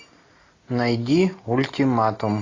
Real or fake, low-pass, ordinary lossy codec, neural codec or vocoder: real; 7.2 kHz; AAC, 32 kbps; none